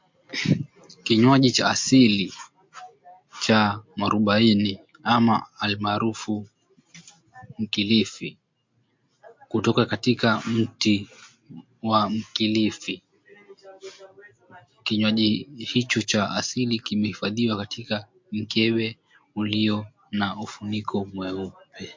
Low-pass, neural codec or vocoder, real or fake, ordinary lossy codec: 7.2 kHz; none; real; MP3, 48 kbps